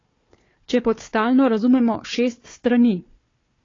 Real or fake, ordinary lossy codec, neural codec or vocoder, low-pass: fake; AAC, 32 kbps; codec, 16 kHz, 4 kbps, FunCodec, trained on Chinese and English, 50 frames a second; 7.2 kHz